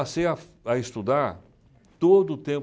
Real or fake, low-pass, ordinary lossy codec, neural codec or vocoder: real; none; none; none